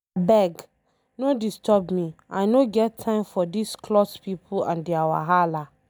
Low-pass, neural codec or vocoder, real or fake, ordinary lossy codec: none; none; real; none